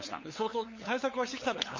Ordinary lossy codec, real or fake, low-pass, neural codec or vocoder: MP3, 32 kbps; fake; 7.2 kHz; codec, 16 kHz, 8 kbps, FunCodec, trained on LibriTTS, 25 frames a second